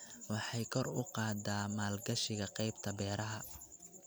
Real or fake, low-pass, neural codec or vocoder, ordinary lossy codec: real; none; none; none